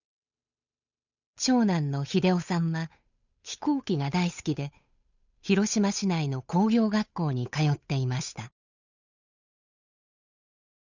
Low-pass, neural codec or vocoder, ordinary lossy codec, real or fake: 7.2 kHz; codec, 16 kHz, 8 kbps, FunCodec, trained on Chinese and English, 25 frames a second; none; fake